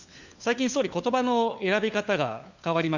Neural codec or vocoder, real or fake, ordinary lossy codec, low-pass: codec, 16 kHz, 4 kbps, FunCodec, trained on LibriTTS, 50 frames a second; fake; Opus, 64 kbps; 7.2 kHz